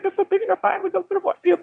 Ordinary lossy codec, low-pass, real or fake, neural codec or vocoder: Opus, 64 kbps; 9.9 kHz; fake; autoencoder, 22.05 kHz, a latent of 192 numbers a frame, VITS, trained on one speaker